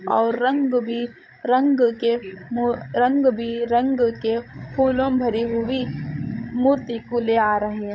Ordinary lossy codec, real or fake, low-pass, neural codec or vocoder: none; fake; none; codec, 16 kHz, 16 kbps, FreqCodec, larger model